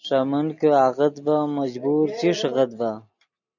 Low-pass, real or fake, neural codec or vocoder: 7.2 kHz; real; none